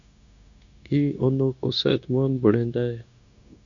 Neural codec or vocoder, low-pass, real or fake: codec, 16 kHz, 0.9 kbps, LongCat-Audio-Codec; 7.2 kHz; fake